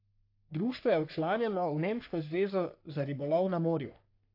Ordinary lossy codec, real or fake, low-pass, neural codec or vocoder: AAC, 32 kbps; fake; 5.4 kHz; codec, 44.1 kHz, 3.4 kbps, Pupu-Codec